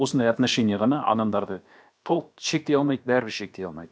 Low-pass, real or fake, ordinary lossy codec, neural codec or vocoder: none; fake; none; codec, 16 kHz, about 1 kbps, DyCAST, with the encoder's durations